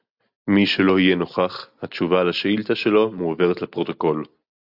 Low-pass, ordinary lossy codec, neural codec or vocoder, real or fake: 5.4 kHz; AAC, 48 kbps; none; real